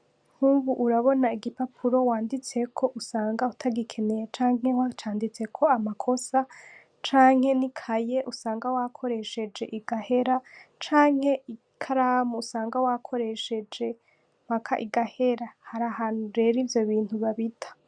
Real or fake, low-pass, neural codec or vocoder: real; 9.9 kHz; none